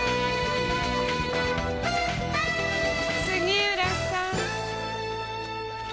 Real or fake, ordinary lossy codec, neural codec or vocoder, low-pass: real; none; none; none